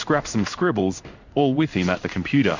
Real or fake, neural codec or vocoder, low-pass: fake; codec, 16 kHz in and 24 kHz out, 1 kbps, XY-Tokenizer; 7.2 kHz